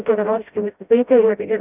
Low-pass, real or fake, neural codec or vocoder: 3.6 kHz; fake; codec, 16 kHz, 0.5 kbps, FreqCodec, smaller model